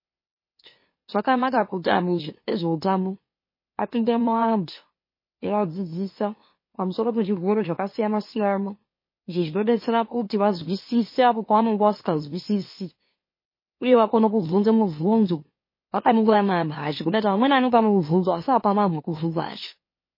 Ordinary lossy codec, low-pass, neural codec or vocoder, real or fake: MP3, 24 kbps; 5.4 kHz; autoencoder, 44.1 kHz, a latent of 192 numbers a frame, MeloTTS; fake